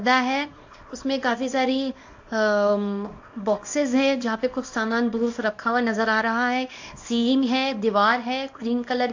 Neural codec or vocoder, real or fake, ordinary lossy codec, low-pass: codec, 24 kHz, 0.9 kbps, WavTokenizer, small release; fake; AAC, 48 kbps; 7.2 kHz